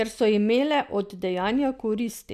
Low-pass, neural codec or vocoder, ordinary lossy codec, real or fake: 14.4 kHz; none; none; real